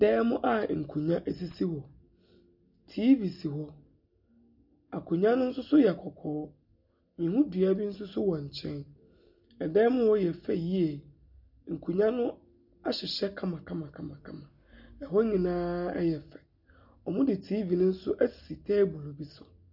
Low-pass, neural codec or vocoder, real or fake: 5.4 kHz; none; real